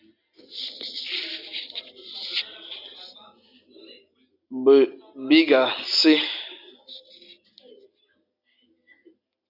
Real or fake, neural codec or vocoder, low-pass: real; none; 5.4 kHz